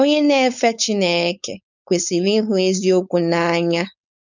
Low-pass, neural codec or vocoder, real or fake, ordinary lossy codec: 7.2 kHz; codec, 16 kHz, 4.8 kbps, FACodec; fake; none